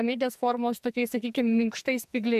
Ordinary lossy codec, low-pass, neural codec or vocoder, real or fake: MP3, 96 kbps; 14.4 kHz; codec, 44.1 kHz, 2.6 kbps, SNAC; fake